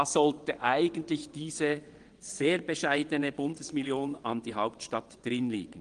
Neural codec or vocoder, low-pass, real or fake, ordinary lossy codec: vocoder, 22.05 kHz, 80 mel bands, WaveNeXt; 9.9 kHz; fake; Opus, 24 kbps